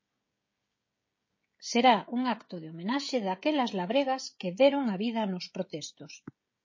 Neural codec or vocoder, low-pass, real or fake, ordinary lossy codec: codec, 16 kHz, 16 kbps, FreqCodec, smaller model; 7.2 kHz; fake; MP3, 32 kbps